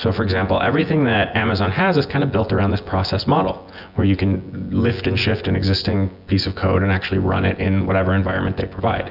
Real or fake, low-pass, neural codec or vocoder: fake; 5.4 kHz; vocoder, 24 kHz, 100 mel bands, Vocos